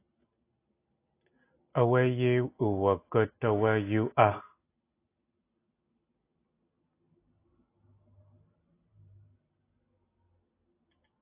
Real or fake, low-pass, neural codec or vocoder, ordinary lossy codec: real; 3.6 kHz; none; AAC, 24 kbps